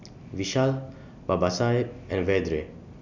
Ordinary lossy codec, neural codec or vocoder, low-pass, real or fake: none; none; 7.2 kHz; real